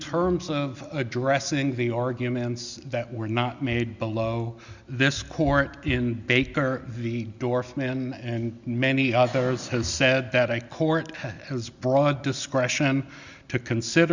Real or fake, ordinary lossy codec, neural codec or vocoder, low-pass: real; Opus, 64 kbps; none; 7.2 kHz